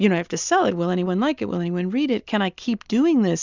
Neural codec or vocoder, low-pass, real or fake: none; 7.2 kHz; real